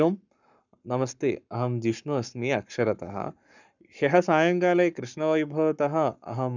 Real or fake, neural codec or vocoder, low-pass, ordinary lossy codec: fake; codec, 44.1 kHz, 7.8 kbps, Pupu-Codec; 7.2 kHz; none